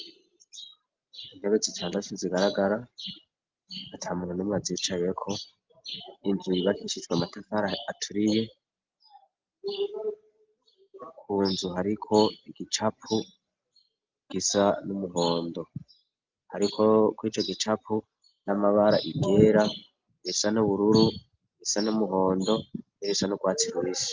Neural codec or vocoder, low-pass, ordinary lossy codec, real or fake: none; 7.2 kHz; Opus, 24 kbps; real